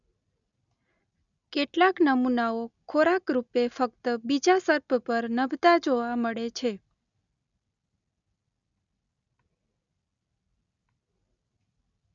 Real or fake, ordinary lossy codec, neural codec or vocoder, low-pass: real; none; none; 7.2 kHz